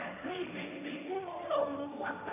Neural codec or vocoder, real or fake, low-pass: codec, 16 kHz, 1.1 kbps, Voila-Tokenizer; fake; 3.6 kHz